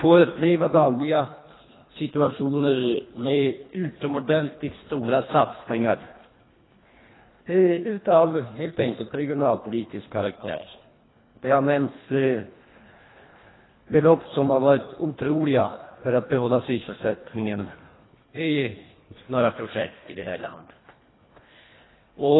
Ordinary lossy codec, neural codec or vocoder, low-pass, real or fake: AAC, 16 kbps; codec, 24 kHz, 1.5 kbps, HILCodec; 7.2 kHz; fake